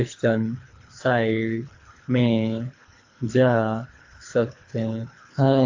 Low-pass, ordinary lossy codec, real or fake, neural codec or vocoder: 7.2 kHz; AAC, 48 kbps; fake; codec, 24 kHz, 3 kbps, HILCodec